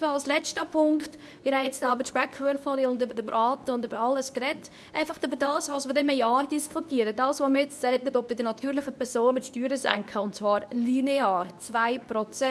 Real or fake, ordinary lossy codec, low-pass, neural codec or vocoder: fake; none; none; codec, 24 kHz, 0.9 kbps, WavTokenizer, medium speech release version 2